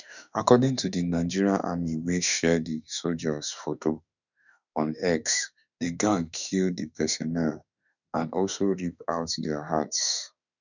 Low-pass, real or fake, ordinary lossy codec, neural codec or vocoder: 7.2 kHz; fake; none; autoencoder, 48 kHz, 32 numbers a frame, DAC-VAE, trained on Japanese speech